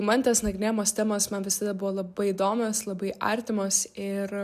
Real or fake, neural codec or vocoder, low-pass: real; none; 14.4 kHz